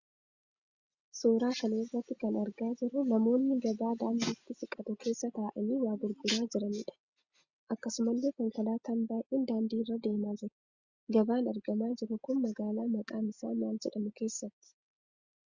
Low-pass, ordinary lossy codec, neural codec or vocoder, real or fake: 7.2 kHz; Opus, 64 kbps; none; real